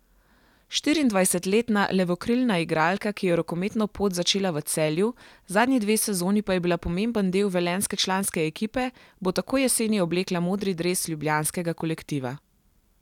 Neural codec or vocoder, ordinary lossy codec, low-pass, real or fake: none; none; 19.8 kHz; real